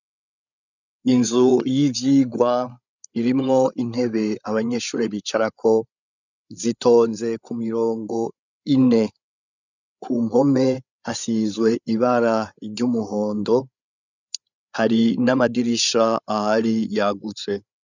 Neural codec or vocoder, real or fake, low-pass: codec, 16 kHz, 8 kbps, FreqCodec, larger model; fake; 7.2 kHz